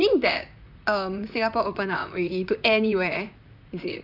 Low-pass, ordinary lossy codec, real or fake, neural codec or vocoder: 5.4 kHz; none; fake; vocoder, 44.1 kHz, 128 mel bands, Pupu-Vocoder